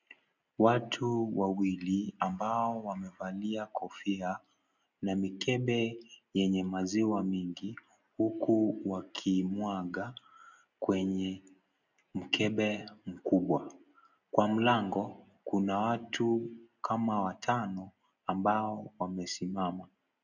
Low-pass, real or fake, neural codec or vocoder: 7.2 kHz; real; none